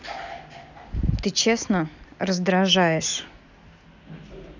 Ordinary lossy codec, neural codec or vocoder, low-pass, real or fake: none; none; 7.2 kHz; real